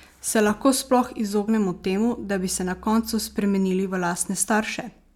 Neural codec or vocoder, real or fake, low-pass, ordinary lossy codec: none; real; 19.8 kHz; none